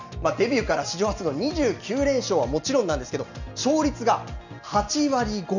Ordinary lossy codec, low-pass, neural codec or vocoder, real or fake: none; 7.2 kHz; none; real